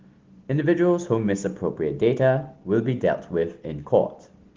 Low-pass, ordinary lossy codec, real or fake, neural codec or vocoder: 7.2 kHz; Opus, 16 kbps; real; none